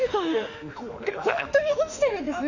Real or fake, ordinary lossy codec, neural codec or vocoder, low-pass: fake; none; autoencoder, 48 kHz, 32 numbers a frame, DAC-VAE, trained on Japanese speech; 7.2 kHz